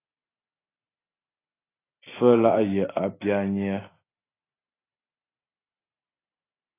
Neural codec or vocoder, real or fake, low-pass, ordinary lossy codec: none; real; 3.6 kHz; AAC, 16 kbps